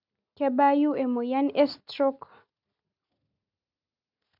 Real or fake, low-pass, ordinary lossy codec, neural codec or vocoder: real; 5.4 kHz; none; none